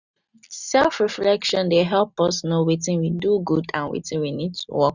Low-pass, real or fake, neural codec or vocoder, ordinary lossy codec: 7.2 kHz; real; none; none